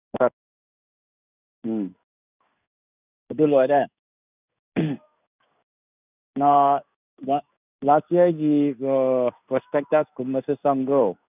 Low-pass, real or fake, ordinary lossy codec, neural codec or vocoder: 3.6 kHz; fake; none; codec, 16 kHz in and 24 kHz out, 1 kbps, XY-Tokenizer